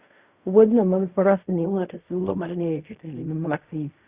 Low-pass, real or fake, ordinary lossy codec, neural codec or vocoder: 3.6 kHz; fake; Opus, 64 kbps; codec, 16 kHz in and 24 kHz out, 0.4 kbps, LongCat-Audio-Codec, fine tuned four codebook decoder